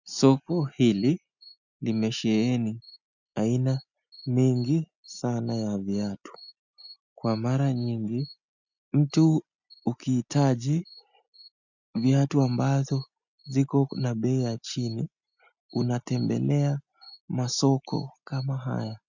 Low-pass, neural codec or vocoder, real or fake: 7.2 kHz; none; real